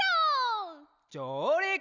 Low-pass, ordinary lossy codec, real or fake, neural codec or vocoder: 7.2 kHz; none; real; none